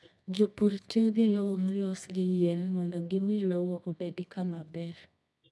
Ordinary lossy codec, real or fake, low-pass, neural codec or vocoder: none; fake; none; codec, 24 kHz, 0.9 kbps, WavTokenizer, medium music audio release